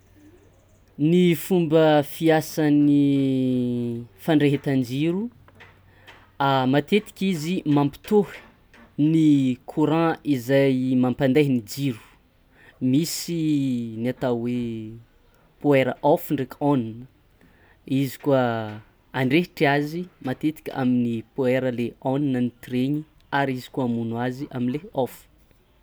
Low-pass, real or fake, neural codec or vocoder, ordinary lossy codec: none; real; none; none